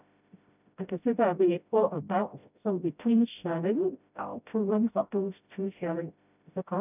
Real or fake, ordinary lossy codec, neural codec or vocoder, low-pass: fake; none; codec, 16 kHz, 0.5 kbps, FreqCodec, smaller model; 3.6 kHz